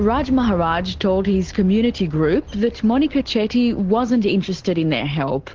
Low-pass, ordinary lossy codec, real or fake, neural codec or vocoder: 7.2 kHz; Opus, 16 kbps; real; none